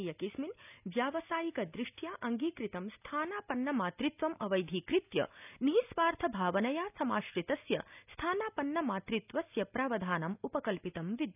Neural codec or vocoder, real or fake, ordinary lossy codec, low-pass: none; real; none; 3.6 kHz